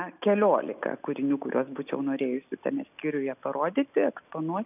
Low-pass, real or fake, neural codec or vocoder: 3.6 kHz; real; none